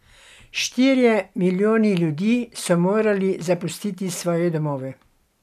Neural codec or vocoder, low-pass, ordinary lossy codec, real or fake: none; 14.4 kHz; none; real